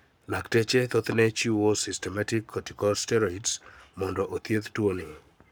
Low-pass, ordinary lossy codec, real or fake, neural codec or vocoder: none; none; fake; codec, 44.1 kHz, 7.8 kbps, Pupu-Codec